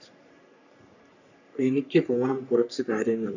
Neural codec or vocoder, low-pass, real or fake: codec, 44.1 kHz, 3.4 kbps, Pupu-Codec; 7.2 kHz; fake